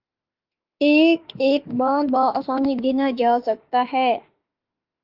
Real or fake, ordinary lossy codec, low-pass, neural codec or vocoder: fake; Opus, 24 kbps; 5.4 kHz; autoencoder, 48 kHz, 32 numbers a frame, DAC-VAE, trained on Japanese speech